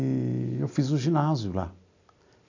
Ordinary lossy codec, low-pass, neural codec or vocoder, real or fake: none; 7.2 kHz; none; real